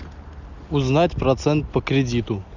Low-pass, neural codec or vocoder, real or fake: 7.2 kHz; none; real